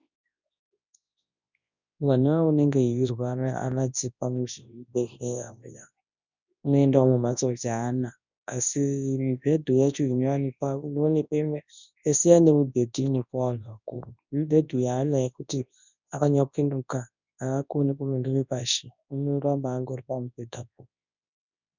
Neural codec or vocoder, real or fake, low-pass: codec, 24 kHz, 0.9 kbps, WavTokenizer, large speech release; fake; 7.2 kHz